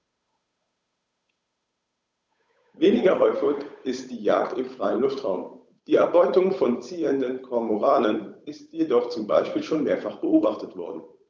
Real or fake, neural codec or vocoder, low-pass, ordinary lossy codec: fake; codec, 16 kHz, 8 kbps, FunCodec, trained on Chinese and English, 25 frames a second; none; none